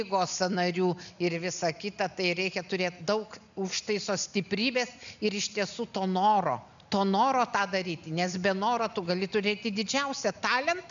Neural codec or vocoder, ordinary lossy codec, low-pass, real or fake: none; MP3, 96 kbps; 7.2 kHz; real